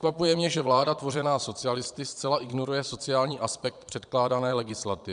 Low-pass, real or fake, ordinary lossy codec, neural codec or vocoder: 9.9 kHz; fake; MP3, 96 kbps; vocoder, 22.05 kHz, 80 mel bands, Vocos